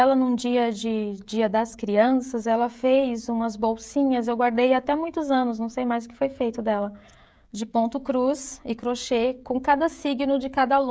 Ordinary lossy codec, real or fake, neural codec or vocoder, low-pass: none; fake; codec, 16 kHz, 16 kbps, FreqCodec, smaller model; none